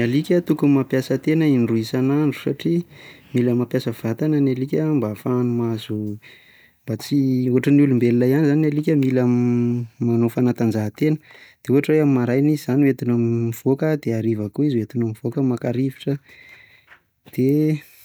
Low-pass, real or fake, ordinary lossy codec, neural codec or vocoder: none; real; none; none